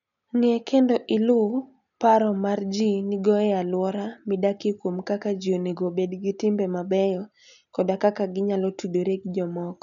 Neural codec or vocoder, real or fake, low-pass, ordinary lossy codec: none; real; 7.2 kHz; none